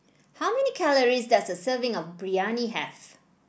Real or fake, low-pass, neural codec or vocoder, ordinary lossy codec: real; none; none; none